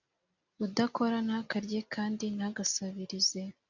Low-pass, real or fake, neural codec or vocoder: 7.2 kHz; real; none